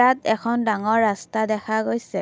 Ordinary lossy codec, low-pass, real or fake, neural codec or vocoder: none; none; real; none